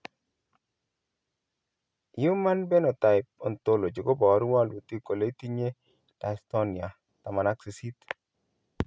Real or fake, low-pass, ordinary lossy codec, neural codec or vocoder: real; none; none; none